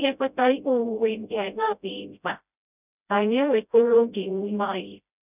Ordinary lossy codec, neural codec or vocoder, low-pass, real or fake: none; codec, 16 kHz, 0.5 kbps, FreqCodec, smaller model; 3.6 kHz; fake